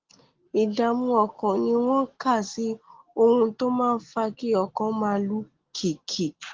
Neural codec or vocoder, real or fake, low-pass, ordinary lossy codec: none; real; 7.2 kHz; Opus, 16 kbps